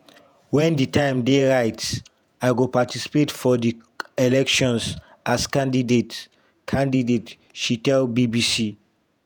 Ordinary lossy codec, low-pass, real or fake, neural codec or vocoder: none; none; fake; vocoder, 48 kHz, 128 mel bands, Vocos